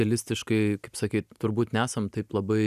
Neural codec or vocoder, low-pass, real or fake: none; 14.4 kHz; real